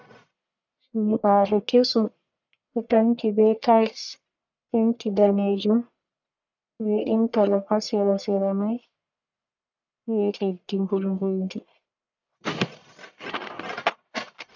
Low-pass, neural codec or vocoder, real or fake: 7.2 kHz; codec, 44.1 kHz, 1.7 kbps, Pupu-Codec; fake